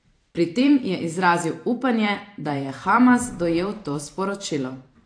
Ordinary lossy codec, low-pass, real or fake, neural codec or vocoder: AAC, 48 kbps; 9.9 kHz; fake; vocoder, 44.1 kHz, 128 mel bands every 512 samples, BigVGAN v2